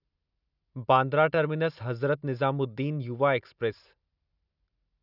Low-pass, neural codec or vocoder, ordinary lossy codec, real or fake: 5.4 kHz; none; none; real